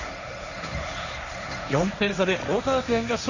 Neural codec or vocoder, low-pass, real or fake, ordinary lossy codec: codec, 16 kHz, 1.1 kbps, Voila-Tokenizer; 7.2 kHz; fake; none